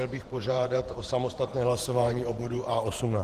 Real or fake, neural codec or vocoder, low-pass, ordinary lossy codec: fake; vocoder, 48 kHz, 128 mel bands, Vocos; 14.4 kHz; Opus, 16 kbps